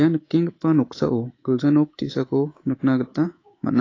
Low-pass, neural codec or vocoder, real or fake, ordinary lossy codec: 7.2 kHz; codec, 24 kHz, 3.1 kbps, DualCodec; fake; AAC, 32 kbps